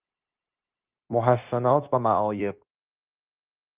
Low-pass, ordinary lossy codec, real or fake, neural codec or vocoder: 3.6 kHz; Opus, 24 kbps; fake; codec, 16 kHz, 0.9 kbps, LongCat-Audio-Codec